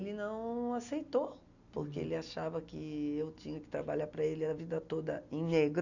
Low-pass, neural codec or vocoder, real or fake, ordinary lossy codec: 7.2 kHz; none; real; none